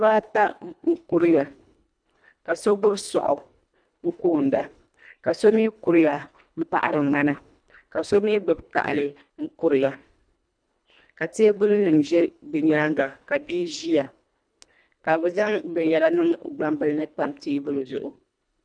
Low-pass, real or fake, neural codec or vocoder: 9.9 kHz; fake; codec, 24 kHz, 1.5 kbps, HILCodec